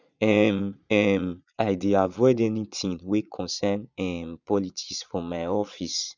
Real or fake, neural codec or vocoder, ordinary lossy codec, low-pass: fake; vocoder, 22.05 kHz, 80 mel bands, Vocos; none; 7.2 kHz